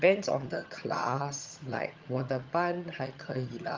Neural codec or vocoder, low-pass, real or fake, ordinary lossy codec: vocoder, 22.05 kHz, 80 mel bands, HiFi-GAN; 7.2 kHz; fake; Opus, 32 kbps